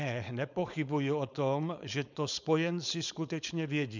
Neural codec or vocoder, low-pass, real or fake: codec, 16 kHz, 4.8 kbps, FACodec; 7.2 kHz; fake